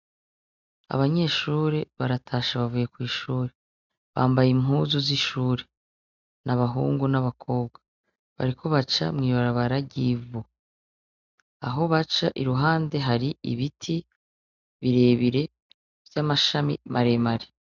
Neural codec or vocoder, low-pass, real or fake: none; 7.2 kHz; real